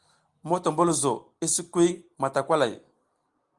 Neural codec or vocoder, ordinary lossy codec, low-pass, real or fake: none; Opus, 24 kbps; 10.8 kHz; real